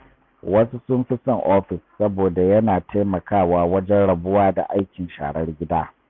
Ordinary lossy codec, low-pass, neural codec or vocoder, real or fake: none; none; none; real